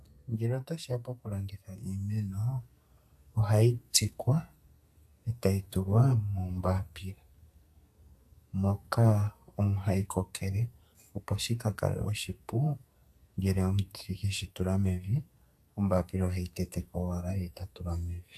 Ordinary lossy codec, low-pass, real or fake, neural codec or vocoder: AAC, 96 kbps; 14.4 kHz; fake; codec, 44.1 kHz, 2.6 kbps, SNAC